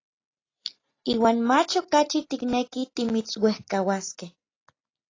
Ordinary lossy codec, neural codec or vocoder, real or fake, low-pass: AAC, 32 kbps; none; real; 7.2 kHz